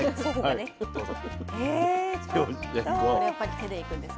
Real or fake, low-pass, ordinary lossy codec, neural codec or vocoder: real; none; none; none